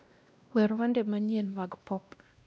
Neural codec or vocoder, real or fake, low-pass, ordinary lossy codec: codec, 16 kHz, 1 kbps, X-Codec, WavLM features, trained on Multilingual LibriSpeech; fake; none; none